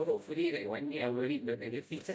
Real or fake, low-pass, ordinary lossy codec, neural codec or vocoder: fake; none; none; codec, 16 kHz, 0.5 kbps, FreqCodec, smaller model